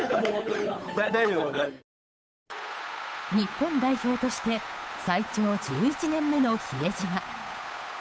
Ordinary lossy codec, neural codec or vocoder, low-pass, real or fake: none; codec, 16 kHz, 8 kbps, FunCodec, trained on Chinese and English, 25 frames a second; none; fake